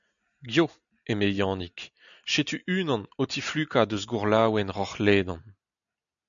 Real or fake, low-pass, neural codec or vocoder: real; 7.2 kHz; none